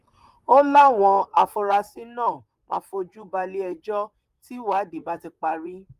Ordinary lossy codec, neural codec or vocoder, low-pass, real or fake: Opus, 32 kbps; vocoder, 44.1 kHz, 128 mel bands, Pupu-Vocoder; 14.4 kHz; fake